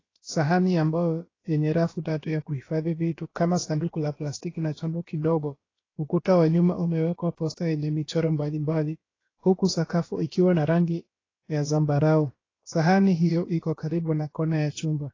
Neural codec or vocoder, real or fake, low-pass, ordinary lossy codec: codec, 16 kHz, about 1 kbps, DyCAST, with the encoder's durations; fake; 7.2 kHz; AAC, 32 kbps